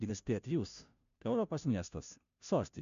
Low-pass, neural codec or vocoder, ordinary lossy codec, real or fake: 7.2 kHz; codec, 16 kHz, 0.5 kbps, FunCodec, trained on Chinese and English, 25 frames a second; MP3, 64 kbps; fake